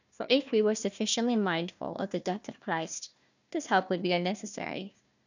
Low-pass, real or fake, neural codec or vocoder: 7.2 kHz; fake; codec, 16 kHz, 1 kbps, FunCodec, trained on Chinese and English, 50 frames a second